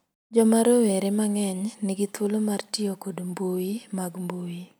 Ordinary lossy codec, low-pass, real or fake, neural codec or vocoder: none; none; real; none